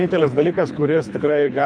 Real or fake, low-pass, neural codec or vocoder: fake; 9.9 kHz; codec, 24 kHz, 3 kbps, HILCodec